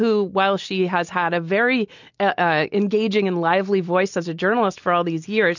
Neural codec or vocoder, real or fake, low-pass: none; real; 7.2 kHz